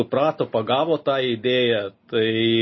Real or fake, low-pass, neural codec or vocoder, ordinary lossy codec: real; 7.2 kHz; none; MP3, 24 kbps